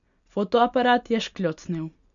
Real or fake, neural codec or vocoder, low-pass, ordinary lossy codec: real; none; 7.2 kHz; none